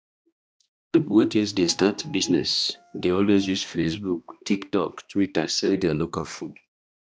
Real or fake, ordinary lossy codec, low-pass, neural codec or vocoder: fake; none; none; codec, 16 kHz, 1 kbps, X-Codec, HuBERT features, trained on balanced general audio